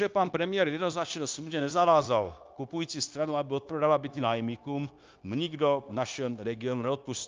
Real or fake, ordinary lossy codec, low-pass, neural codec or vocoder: fake; Opus, 24 kbps; 7.2 kHz; codec, 16 kHz, 0.9 kbps, LongCat-Audio-Codec